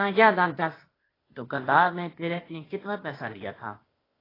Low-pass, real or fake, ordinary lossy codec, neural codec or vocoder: 5.4 kHz; fake; AAC, 24 kbps; codec, 16 kHz, 0.8 kbps, ZipCodec